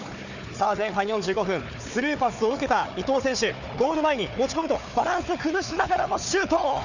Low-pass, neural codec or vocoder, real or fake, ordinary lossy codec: 7.2 kHz; codec, 16 kHz, 4 kbps, FunCodec, trained on Chinese and English, 50 frames a second; fake; none